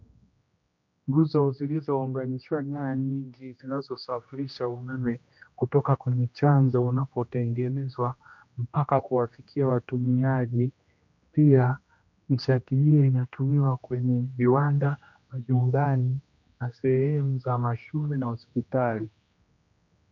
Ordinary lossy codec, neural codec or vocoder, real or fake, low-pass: MP3, 48 kbps; codec, 16 kHz, 1 kbps, X-Codec, HuBERT features, trained on general audio; fake; 7.2 kHz